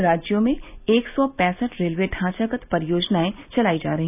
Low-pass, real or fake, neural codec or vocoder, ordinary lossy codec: 3.6 kHz; real; none; none